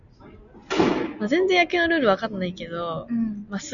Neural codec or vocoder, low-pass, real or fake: none; 7.2 kHz; real